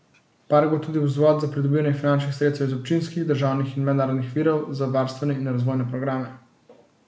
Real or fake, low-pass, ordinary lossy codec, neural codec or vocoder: real; none; none; none